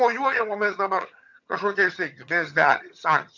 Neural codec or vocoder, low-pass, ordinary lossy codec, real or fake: vocoder, 22.05 kHz, 80 mel bands, HiFi-GAN; 7.2 kHz; MP3, 64 kbps; fake